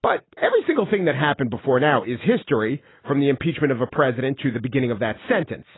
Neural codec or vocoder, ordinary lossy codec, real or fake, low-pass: none; AAC, 16 kbps; real; 7.2 kHz